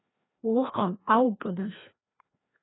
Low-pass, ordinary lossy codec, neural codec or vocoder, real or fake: 7.2 kHz; AAC, 16 kbps; codec, 16 kHz, 1 kbps, FreqCodec, larger model; fake